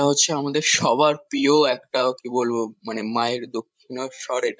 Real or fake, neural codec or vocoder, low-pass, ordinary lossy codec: fake; codec, 16 kHz, 16 kbps, FreqCodec, larger model; none; none